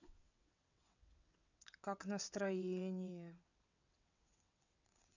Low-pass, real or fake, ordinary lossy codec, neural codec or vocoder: 7.2 kHz; fake; none; vocoder, 22.05 kHz, 80 mel bands, WaveNeXt